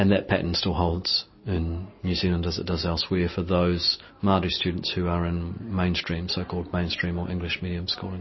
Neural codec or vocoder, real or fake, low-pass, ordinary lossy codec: none; real; 7.2 kHz; MP3, 24 kbps